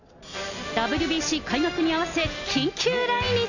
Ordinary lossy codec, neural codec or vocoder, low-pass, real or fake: none; none; 7.2 kHz; real